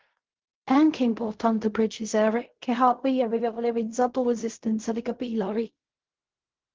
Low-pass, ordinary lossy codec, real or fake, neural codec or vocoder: 7.2 kHz; Opus, 16 kbps; fake; codec, 16 kHz in and 24 kHz out, 0.4 kbps, LongCat-Audio-Codec, fine tuned four codebook decoder